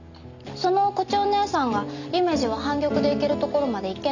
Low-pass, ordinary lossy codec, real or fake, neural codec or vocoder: 7.2 kHz; none; real; none